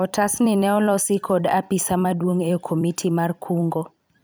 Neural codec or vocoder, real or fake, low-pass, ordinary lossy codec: vocoder, 44.1 kHz, 128 mel bands every 256 samples, BigVGAN v2; fake; none; none